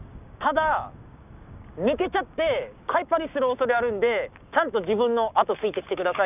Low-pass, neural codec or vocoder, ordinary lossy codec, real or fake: 3.6 kHz; codec, 16 kHz, 6 kbps, DAC; none; fake